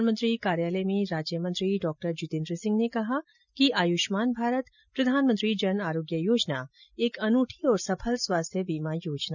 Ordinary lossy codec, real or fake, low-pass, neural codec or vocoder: none; real; 7.2 kHz; none